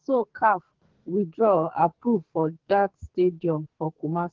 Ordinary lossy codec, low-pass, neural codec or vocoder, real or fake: Opus, 16 kbps; 7.2 kHz; codec, 44.1 kHz, 2.6 kbps, SNAC; fake